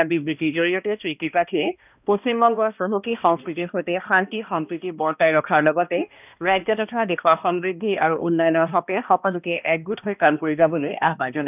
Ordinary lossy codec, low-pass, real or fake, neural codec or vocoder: none; 3.6 kHz; fake; codec, 16 kHz, 1 kbps, X-Codec, HuBERT features, trained on balanced general audio